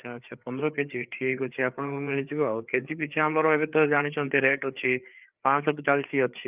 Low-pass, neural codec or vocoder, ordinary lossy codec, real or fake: 3.6 kHz; codec, 16 kHz, 4 kbps, FreqCodec, larger model; Opus, 24 kbps; fake